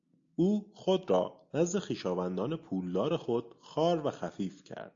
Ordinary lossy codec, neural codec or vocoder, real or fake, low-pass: AAC, 64 kbps; none; real; 7.2 kHz